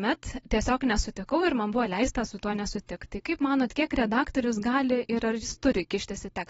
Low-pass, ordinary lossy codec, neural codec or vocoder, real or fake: 19.8 kHz; AAC, 24 kbps; vocoder, 44.1 kHz, 128 mel bands every 256 samples, BigVGAN v2; fake